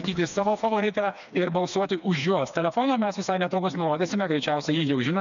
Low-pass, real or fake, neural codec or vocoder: 7.2 kHz; fake; codec, 16 kHz, 2 kbps, FreqCodec, smaller model